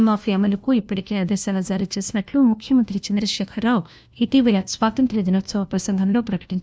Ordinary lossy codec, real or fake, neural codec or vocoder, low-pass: none; fake; codec, 16 kHz, 1 kbps, FunCodec, trained on LibriTTS, 50 frames a second; none